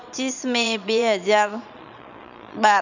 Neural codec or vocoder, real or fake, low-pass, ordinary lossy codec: vocoder, 22.05 kHz, 80 mel bands, Vocos; fake; 7.2 kHz; none